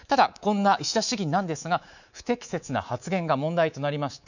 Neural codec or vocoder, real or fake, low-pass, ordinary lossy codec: codec, 24 kHz, 3.1 kbps, DualCodec; fake; 7.2 kHz; none